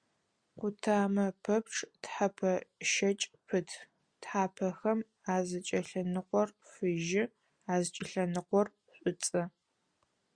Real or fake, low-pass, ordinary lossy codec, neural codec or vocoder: real; 9.9 kHz; Opus, 64 kbps; none